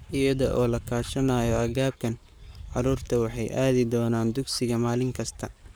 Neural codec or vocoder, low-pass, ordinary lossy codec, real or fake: codec, 44.1 kHz, 7.8 kbps, Pupu-Codec; none; none; fake